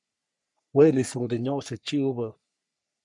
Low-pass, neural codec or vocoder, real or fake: 10.8 kHz; codec, 44.1 kHz, 3.4 kbps, Pupu-Codec; fake